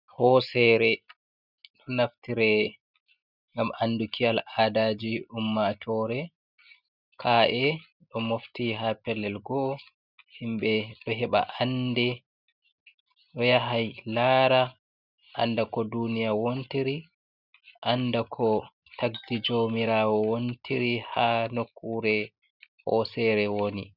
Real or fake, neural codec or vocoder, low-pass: real; none; 5.4 kHz